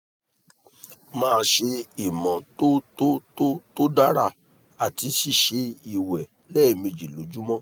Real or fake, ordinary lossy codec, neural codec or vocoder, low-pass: real; none; none; none